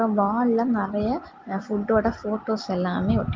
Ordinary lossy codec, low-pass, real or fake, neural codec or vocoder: Opus, 32 kbps; 7.2 kHz; real; none